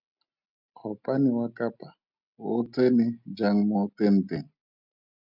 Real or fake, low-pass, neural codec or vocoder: real; 5.4 kHz; none